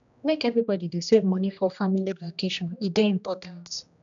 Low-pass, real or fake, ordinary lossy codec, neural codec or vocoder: 7.2 kHz; fake; none; codec, 16 kHz, 1 kbps, X-Codec, HuBERT features, trained on general audio